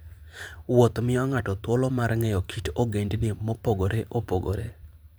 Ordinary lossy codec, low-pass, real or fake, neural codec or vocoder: none; none; fake; vocoder, 44.1 kHz, 128 mel bands every 512 samples, BigVGAN v2